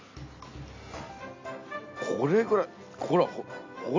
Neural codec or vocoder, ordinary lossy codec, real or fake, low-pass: none; MP3, 48 kbps; real; 7.2 kHz